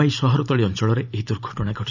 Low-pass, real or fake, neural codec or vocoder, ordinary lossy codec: 7.2 kHz; fake; vocoder, 44.1 kHz, 128 mel bands every 512 samples, BigVGAN v2; none